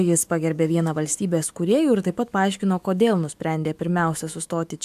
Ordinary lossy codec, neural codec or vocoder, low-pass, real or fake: AAC, 96 kbps; autoencoder, 48 kHz, 128 numbers a frame, DAC-VAE, trained on Japanese speech; 14.4 kHz; fake